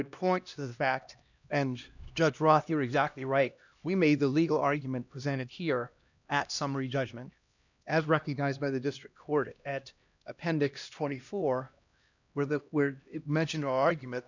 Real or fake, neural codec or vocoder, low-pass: fake; codec, 16 kHz, 1 kbps, X-Codec, HuBERT features, trained on LibriSpeech; 7.2 kHz